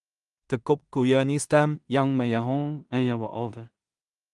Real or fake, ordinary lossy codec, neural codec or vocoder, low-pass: fake; Opus, 32 kbps; codec, 16 kHz in and 24 kHz out, 0.4 kbps, LongCat-Audio-Codec, two codebook decoder; 10.8 kHz